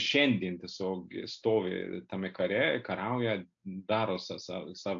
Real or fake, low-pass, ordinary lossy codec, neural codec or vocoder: real; 7.2 kHz; AAC, 64 kbps; none